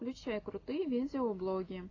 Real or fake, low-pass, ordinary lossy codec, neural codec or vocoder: real; 7.2 kHz; AAC, 32 kbps; none